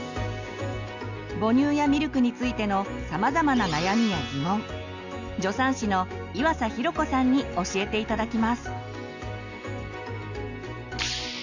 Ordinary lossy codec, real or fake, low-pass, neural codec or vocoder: none; real; 7.2 kHz; none